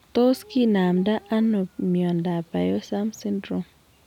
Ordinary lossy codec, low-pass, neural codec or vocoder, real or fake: none; 19.8 kHz; none; real